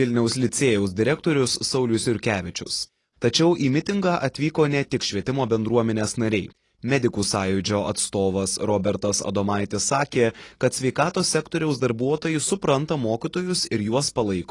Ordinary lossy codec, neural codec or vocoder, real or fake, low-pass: AAC, 32 kbps; none; real; 10.8 kHz